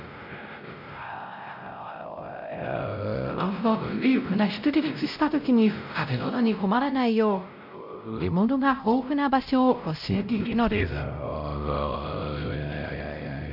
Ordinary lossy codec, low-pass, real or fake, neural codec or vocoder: none; 5.4 kHz; fake; codec, 16 kHz, 0.5 kbps, X-Codec, WavLM features, trained on Multilingual LibriSpeech